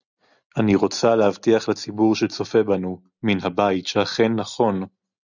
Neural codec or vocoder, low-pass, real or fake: none; 7.2 kHz; real